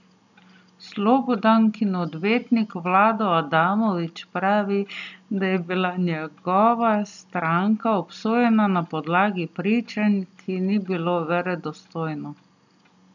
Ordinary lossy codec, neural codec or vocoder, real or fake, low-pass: none; none; real; 7.2 kHz